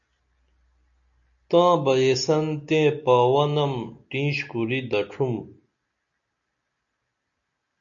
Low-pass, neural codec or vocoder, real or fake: 7.2 kHz; none; real